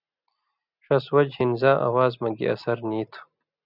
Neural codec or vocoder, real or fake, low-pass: none; real; 5.4 kHz